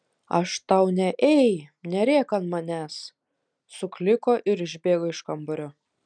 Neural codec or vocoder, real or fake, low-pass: none; real; 9.9 kHz